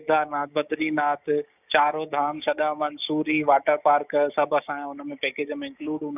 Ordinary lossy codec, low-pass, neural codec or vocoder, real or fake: none; 3.6 kHz; codec, 16 kHz, 6 kbps, DAC; fake